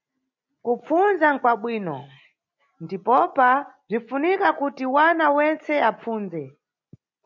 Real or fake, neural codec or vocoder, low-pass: real; none; 7.2 kHz